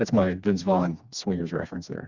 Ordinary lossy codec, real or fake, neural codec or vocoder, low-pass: Opus, 64 kbps; fake; codec, 16 kHz, 2 kbps, FreqCodec, smaller model; 7.2 kHz